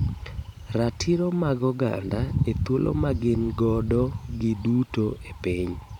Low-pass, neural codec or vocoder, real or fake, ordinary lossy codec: 19.8 kHz; vocoder, 44.1 kHz, 128 mel bands every 512 samples, BigVGAN v2; fake; none